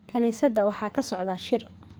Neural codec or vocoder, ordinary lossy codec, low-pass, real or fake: codec, 44.1 kHz, 2.6 kbps, SNAC; none; none; fake